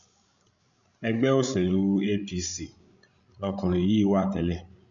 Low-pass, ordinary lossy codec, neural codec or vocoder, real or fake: 7.2 kHz; none; codec, 16 kHz, 16 kbps, FreqCodec, larger model; fake